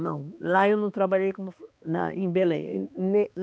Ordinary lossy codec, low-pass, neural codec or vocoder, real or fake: none; none; codec, 16 kHz, 2 kbps, X-Codec, HuBERT features, trained on balanced general audio; fake